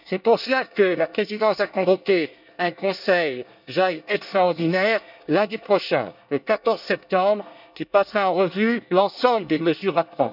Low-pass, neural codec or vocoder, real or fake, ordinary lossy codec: 5.4 kHz; codec, 24 kHz, 1 kbps, SNAC; fake; none